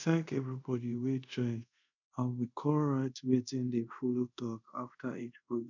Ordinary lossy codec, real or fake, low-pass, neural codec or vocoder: none; fake; 7.2 kHz; codec, 24 kHz, 0.5 kbps, DualCodec